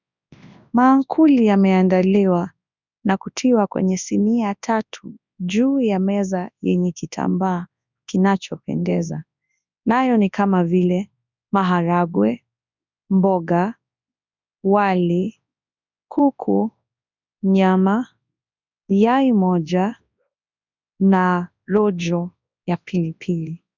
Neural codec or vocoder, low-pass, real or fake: codec, 24 kHz, 0.9 kbps, WavTokenizer, large speech release; 7.2 kHz; fake